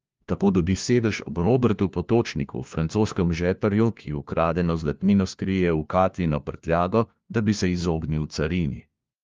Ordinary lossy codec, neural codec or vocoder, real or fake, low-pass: Opus, 32 kbps; codec, 16 kHz, 1 kbps, FunCodec, trained on LibriTTS, 50 frames a second; fake; 7.2 kHz